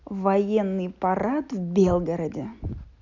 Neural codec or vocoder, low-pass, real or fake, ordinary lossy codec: none; 7.2 kHz; real; none